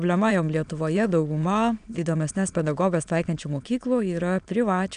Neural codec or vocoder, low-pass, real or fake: autoencoder, 22.05 kHz, a latent of 192 numbers a frame, VITS, trained on many speakers; 9.9 kHz; fake